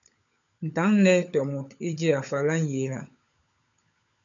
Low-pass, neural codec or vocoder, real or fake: 7.2 kHz; codec, 16 kHz, 16 kbps, FunCodec, trained on LibriTTS, 50 frames a second; fake